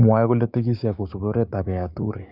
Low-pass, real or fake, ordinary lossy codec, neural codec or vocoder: 5.4 kHz; fake; none; codec, 16 kHz, 6 kbps, DAC